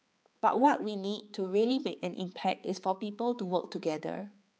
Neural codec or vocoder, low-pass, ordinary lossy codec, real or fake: codec, 16 kHz, 4 kbps, X-Codec, HuBERT features, trained on balanced general audio; none; none; fake